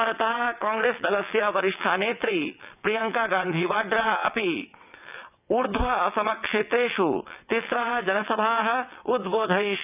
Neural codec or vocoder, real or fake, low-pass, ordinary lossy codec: vocoder, 22.05 kHz, 80 mel bands, WaveNeXt; fake; 3.6 kHz; none